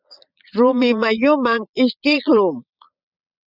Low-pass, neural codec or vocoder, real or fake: 5.4 kHz; vocoder, 22.05 kHz, 80 mel bands, Vocos; fake